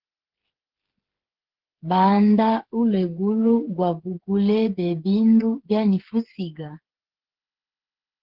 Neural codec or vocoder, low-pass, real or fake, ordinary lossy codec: codec, 16 kHz, 8 kbps, FreqCodec, smaller model; 5.4 kHz; fake; Opus, 16 kbps